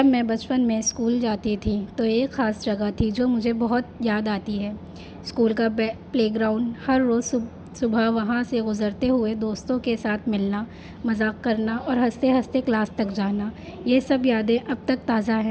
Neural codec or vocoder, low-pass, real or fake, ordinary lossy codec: none; none; real; none